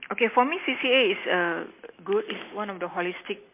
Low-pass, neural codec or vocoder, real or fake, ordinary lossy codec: 3.6 kHz; none; real; MP3, 24 kbps